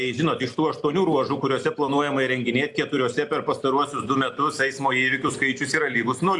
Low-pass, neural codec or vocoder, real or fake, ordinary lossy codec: 10.8 kHz; none; real; AAC, 64 kbps